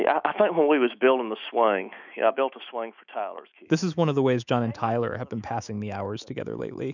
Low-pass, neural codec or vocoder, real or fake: 7.2 kHz; autoencoder, 48 kHz, 128 numbers a frame, DAC-VAE, trained on Japanese speech; fake